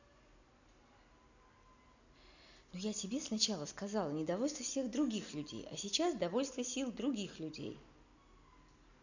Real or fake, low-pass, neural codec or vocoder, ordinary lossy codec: real; 7.2 kHz; none; none